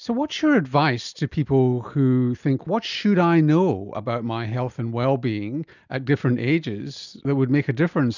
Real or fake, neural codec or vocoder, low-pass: real; none; 7.2 kHz